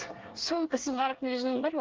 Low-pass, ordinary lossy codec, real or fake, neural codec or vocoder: 7.2 kHz; Opus, 16 kbps; fake; codec, 24 kHz, 1 kbps, SNAC